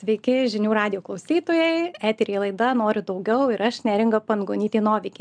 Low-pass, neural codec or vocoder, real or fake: 9.9 kHz; none; real